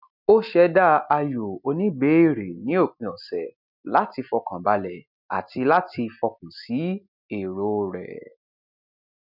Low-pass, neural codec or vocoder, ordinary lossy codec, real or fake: 5.4 kHz; none; none; real